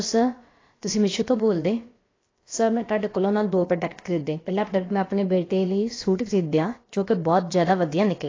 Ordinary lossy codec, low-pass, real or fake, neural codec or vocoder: AAC, 32 kbps; 7.2 kHz; fake; codec, 16 kHz, about 1 kbps, DyCAST, with the encoder's durations